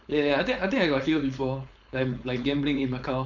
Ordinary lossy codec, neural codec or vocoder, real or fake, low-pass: none; codec, 16 kHz, 4.8 kbps, FACodec; fake; 7.2 kHz